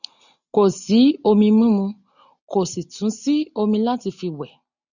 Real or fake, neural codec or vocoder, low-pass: real; none; 7.2 kHz